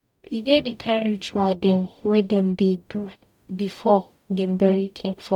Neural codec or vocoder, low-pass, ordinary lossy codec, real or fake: codec, 44.1 kHz, 0.9 kbps, DAC; 19.8 kHz; none; fake